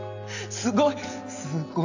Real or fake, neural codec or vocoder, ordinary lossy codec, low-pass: real; none; AAC, 48 kbps; 7.2 kHz